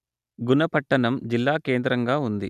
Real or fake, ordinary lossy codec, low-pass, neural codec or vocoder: real; none; 14.4 kHz; none